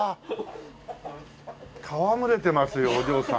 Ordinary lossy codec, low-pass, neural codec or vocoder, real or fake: none; none; none; real